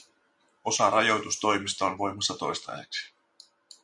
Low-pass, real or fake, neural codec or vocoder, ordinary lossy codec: 10.8 kHz; real; none; MP3, 96 kbps